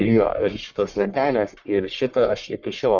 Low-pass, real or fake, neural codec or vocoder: 7.2 kHz; fake; codec, 44.1 kHz, 1.7 kbps, Pupu-Codec